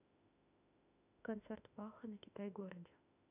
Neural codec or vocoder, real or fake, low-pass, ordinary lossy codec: autoencoder, 48 kHz, 32 numbers a frame, DAC-VAE, trained on Japanese speech; fake; 3.6 kHz; none